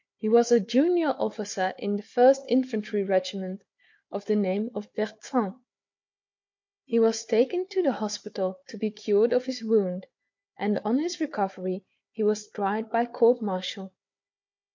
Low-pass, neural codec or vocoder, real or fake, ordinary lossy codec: 7.2 kHz; codec, 16 kHz, 4 kbps, FunCodec, trained on Chinese and English, 50 frames a second; fake; MP3, 48 kbps